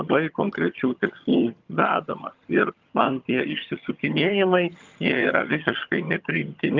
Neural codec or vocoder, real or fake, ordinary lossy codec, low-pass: vocoder, 22.05 kHz, 80 mel bands, HiFi-GAN; fake; Opus, 24 kbps; 7.2 kHz